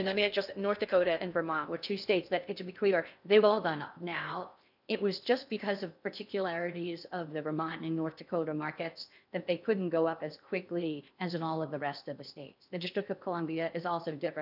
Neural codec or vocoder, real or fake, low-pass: codec, 16 kHz in and 24 kHz out, 0.6 kbps, FocalCodec, streaming, 2048 codes; fake; 5.4 kHz